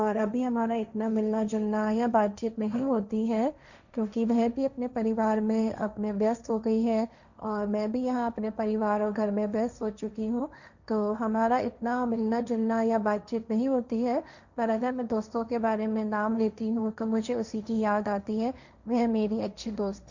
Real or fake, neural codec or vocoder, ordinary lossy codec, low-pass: fake; codec, 16 kHz, 1.1 kbps, Voila-Tokenizer; none; 7.2 kHz